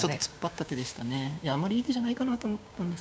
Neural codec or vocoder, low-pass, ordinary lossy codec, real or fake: codec, 16 kHz, 6 kbps, DAC; none; none; fake